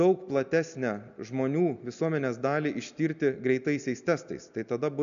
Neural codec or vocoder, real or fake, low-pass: none; real; 7.2 kHz